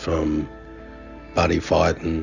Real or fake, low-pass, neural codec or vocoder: real; 7.2 kHz; none